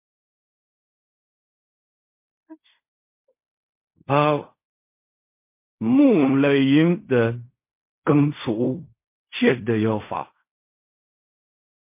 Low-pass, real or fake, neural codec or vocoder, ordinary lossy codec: 3.6 kHz; fake; codec, 16 kHz in and 24 kHz out, 0.4 kbps, LongCat-Audio-Codec, fine tuned four codebook decoder; MP3, 24 kbps